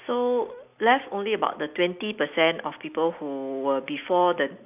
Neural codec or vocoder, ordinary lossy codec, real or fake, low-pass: none; AAC, 32 kbps; real; 3.6 kHz